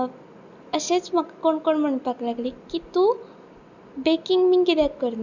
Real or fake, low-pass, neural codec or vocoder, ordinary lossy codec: real; 7.2 kHz; none; none